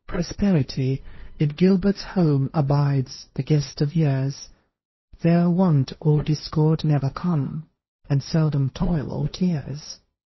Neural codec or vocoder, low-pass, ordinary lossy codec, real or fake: codec, 16 kHz, 1.1 kbps, Voila-Tokenizer; 7.2 kHz; MP3, 24 kbps; fake